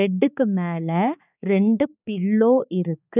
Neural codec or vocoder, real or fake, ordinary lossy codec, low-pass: codec, 16 kHz, 4 kbps, X-Codec, HuBERT features, trained on balanced general audio; fake; none; 3.6 kHz